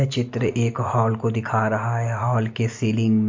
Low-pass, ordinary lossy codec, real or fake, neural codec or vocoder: 7.2 kHz; MP3, 48 kbps; real; none